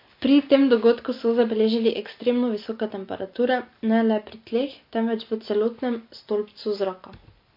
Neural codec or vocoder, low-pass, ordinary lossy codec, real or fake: none; 5.4 kHz; MP3, 32 kbps; real